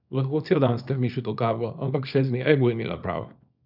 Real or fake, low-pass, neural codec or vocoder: fake; 5.4 kHz; codec, 24 kHz, 0.9 kbps, WavTokenizer, small release